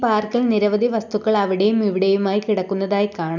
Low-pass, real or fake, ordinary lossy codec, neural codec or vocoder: 7.2 kHz; real; none; none